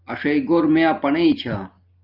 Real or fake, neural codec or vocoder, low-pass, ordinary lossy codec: real; none; 5.4 kHz; Opus, 24 kbps